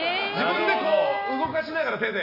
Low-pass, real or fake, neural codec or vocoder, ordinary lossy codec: 5.4 kHz; real; none; none